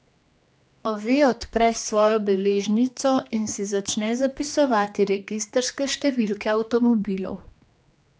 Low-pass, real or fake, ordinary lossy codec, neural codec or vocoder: none; fake; none; codec, 16 kHz, 2 kbps, X-Codec, HuBERT features, trained on general audio